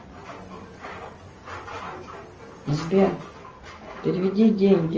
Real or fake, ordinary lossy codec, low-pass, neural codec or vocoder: real; Opus, 24 kbps; 7.2 kHz; none